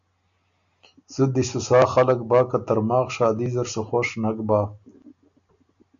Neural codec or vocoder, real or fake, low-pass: none; real; 7.2 kHz